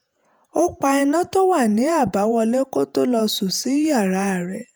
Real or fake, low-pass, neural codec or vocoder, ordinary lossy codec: fake; none; vocoder, 48 kHz, 128 mel bands, Vocos; none